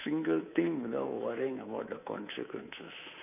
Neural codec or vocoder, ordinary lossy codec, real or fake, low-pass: codec, 24 kHz, 3.1 kbps, DualCodec; none; fake; 3.6 kHz